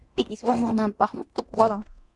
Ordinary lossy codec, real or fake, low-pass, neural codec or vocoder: MP3, 96 kbps; fake; 10.8 kHz; codec, 24 kHz, 0.9 kbps, DualCodec